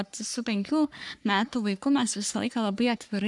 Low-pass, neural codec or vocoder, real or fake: 10.8 kHz; codec, 44.1 kHz, 3.4 kbps, Pupu-Codec; fake